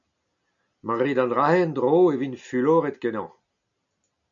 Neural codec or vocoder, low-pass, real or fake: none; 7.2 kHz; real